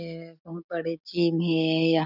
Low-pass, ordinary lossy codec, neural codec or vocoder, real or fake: 5.4 kHz; none; none; real